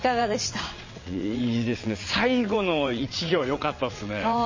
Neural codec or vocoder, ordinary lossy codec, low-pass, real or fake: vocoder, 22.05 kHz, 80 mel bands, WaveNeXt; MP3, 32 kbps; 7.2 kHz; fake